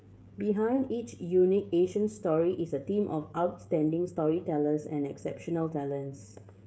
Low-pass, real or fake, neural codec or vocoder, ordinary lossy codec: none; fake; codec, 16 kHz, 16 kbps, FreqCodec, smaller model; none